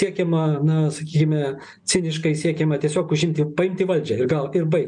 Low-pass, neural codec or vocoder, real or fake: 9.9 kHz; none; real